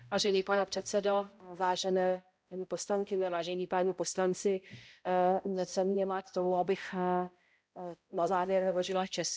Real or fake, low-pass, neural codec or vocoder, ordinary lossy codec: fake; none; codec, 16 kHz, 0.5 kbps, X-Codec, HuBERT features, trained on balanced general audio; none